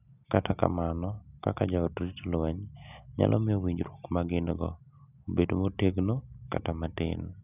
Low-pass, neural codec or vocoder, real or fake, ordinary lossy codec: 3.6 kHz; none; real; none